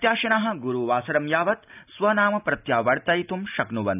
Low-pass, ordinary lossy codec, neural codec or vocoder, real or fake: 3.6 kHz; none; none; real